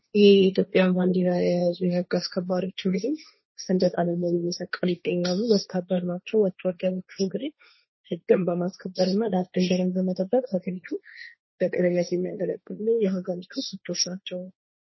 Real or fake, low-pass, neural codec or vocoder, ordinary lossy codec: fake; 7.2 kHz; codec, 16 kHz, 1.1 kbps, Voila-Tokenizer; MP3, 24 kbps